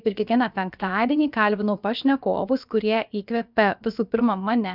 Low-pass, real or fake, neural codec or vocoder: 5.4 kHz; fake; codec, 16 kHz, about 1 kbps, DyCAST, with the encoder's durations